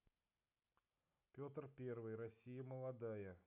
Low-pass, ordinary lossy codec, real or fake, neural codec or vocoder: 3.6 kHz; none; real; none